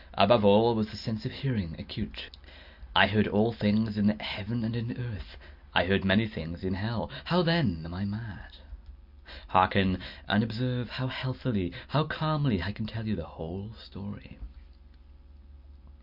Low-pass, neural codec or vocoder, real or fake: 5.4 kHz; none; real